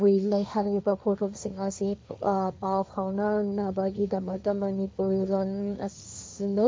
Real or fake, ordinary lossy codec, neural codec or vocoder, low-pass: fake; none; codec, 16 kHz, 1.1 kbps, Voila-Tokenizer; none